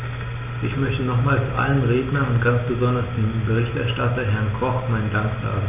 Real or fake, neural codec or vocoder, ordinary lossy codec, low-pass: real; none; none; 3.6 kHz